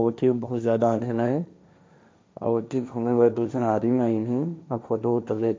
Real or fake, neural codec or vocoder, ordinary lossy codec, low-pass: fake; codec, 16 kHz, 1.1 kbps, Voila-Tokenizer; none; none